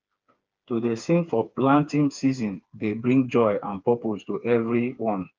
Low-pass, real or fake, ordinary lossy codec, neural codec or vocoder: 7.2 kHz; fake; Opus, 24 kbps; codec, 16 kHz, 4 kbps, FreqCodec, smaller model